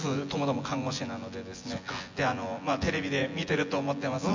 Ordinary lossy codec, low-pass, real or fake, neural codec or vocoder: MP3, 64 kbps; 7.2 kHz; fake; vocoder, 24 kHz, 100 mel bands, Vocos